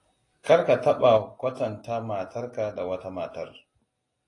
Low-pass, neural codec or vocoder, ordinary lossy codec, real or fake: 10.8 kHz; none; AAC, 32 kbps; real